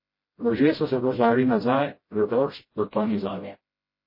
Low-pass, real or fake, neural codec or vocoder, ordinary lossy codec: 5.4 kHz; fake; codec, 16 kHz, 0.5 kbps, FreqCodec, smaller model; MP3, 24 kbps